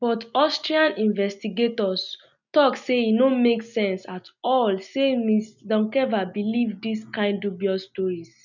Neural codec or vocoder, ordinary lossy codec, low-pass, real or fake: none; none; 7.2 kHz; real